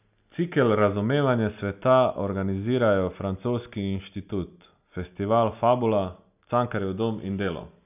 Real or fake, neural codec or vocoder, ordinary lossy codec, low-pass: real; none; none; 3.6 kHz